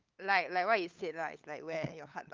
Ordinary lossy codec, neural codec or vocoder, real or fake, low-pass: Opus, 24 kbps; codec, 16 kHz, 8 kbps, FunCodec, trained on LibriTTS, 25 frames a second; fake; 7.2 kHz